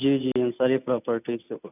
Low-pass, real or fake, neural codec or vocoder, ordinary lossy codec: 3.6 kHz; real; none; none